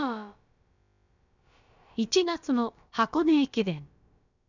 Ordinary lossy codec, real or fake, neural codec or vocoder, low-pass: none; fake; codec, 16 kHz, about 1 kbps, DyCAST, with the encoder's durations; 7.2 kHz